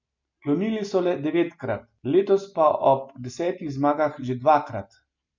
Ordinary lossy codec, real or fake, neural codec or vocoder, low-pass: MP3, 64 kbps; real; none; 7.2 kHz